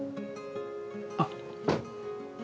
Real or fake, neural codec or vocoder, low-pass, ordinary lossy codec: real; none; none; none